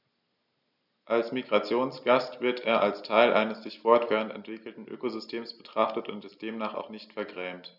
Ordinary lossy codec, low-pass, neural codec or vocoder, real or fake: none; 5.4 kHz; none; real